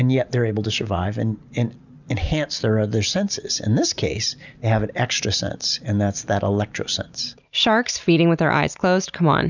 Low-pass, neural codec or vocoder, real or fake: 7.2 kHz; none; real